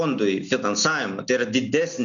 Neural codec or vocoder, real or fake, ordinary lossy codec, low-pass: none; real; MP3, 96 kbps; 7.2 kHz